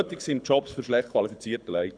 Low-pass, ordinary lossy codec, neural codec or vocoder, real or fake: 9.9 kHz; none; codec, 24 kHz, 6 kbps, HILCodec; fake